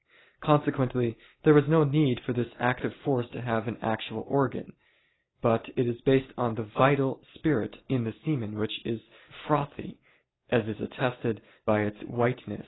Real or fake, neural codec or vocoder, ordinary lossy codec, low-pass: real; none; AAC, 16 kbps; 7.2 kHz